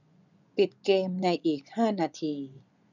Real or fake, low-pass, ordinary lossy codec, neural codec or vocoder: fake; 7.2 kHz; none; vocoder, 24 kHz, 100 mel bands, Vocos